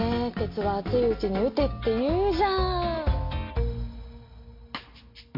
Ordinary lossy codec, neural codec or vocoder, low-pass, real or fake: MP3, 32 kbps; none; 5.4 kHz; real